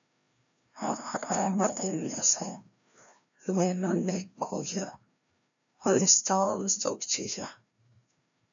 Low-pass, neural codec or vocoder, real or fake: 7.2 kHz; codec, 16 kHz, 1 kbps, FreqCodec, larger model; fake